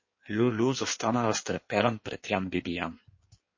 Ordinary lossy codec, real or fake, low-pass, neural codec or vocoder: MP3, 32 kbps; fake; 7.2 kHz; codec, 16 kHz in and 24 kHz out, 1.1 kbps, FireRedTTS-2 codec